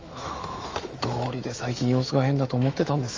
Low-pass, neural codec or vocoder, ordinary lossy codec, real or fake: 7.2 kHz; autoencoder, 48 kHz, 128 numbers a frame, DAC-VAE, trained on Japanese speech; Opus, 32 kbps; fake